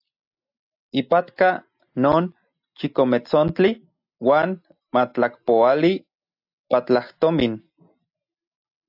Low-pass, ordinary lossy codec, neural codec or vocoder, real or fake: 5.4 kHz; AAC, 48 kbps; none; real